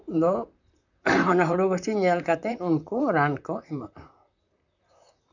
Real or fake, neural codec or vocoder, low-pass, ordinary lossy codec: fake; vocoder, 44.1 kHz, 128 mel bands, Pupu-Vocoder; 7.2 kHz; none